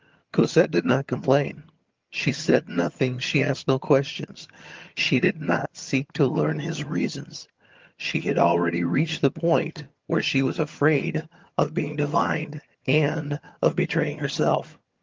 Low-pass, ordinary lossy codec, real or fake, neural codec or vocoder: 7.2 kHz; Opus, 32 kbps; fake; vocoder, 22.05 kHz, 80 mel bands, HiFi-GAN